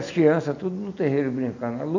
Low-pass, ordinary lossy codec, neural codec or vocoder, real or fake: 7.2 kHz; none; none; real